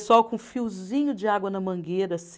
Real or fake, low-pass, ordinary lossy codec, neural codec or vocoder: real; none; none; none